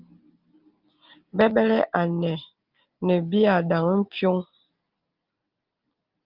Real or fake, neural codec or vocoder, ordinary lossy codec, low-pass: real; none; Opus, 24 kbps; 5.4 kHz